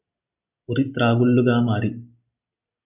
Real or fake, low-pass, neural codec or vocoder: real; 3.6 kHz; none